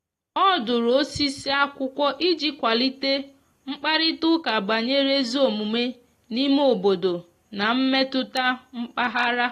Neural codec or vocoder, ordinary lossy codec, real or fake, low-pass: none; AAC, 48 kbps; real; 14.4 kHz